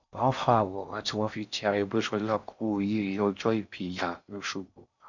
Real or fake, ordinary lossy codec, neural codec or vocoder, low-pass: fake; none; codec, 16 kHz in and 24 kHz out, 0.6 kbps, FocalCodec, streaming, 4096 codes; 7.2 kHz